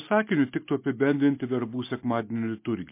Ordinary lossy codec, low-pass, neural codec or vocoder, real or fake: MP3, 24 kbps; 3.6 kHz; none; real